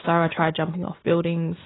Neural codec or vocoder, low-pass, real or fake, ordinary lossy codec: none; 7.2 kHz; real; AAC, 16 kbps